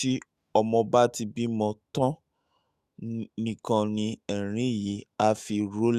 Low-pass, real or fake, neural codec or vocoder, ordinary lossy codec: 14.4 kHz; fake; autoencoder, 48 kHz, 128 numbers a frame, DAC-VAE, trained on Japanese speech; Opus, 64 kbps